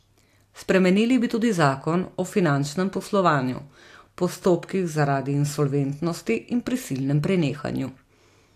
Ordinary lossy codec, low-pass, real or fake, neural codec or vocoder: AAC, 64 kbps; 14.4 kHz; real; none